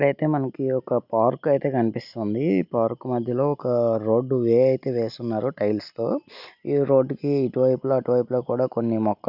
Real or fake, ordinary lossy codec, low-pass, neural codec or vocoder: real; none; 5.4 kHz; none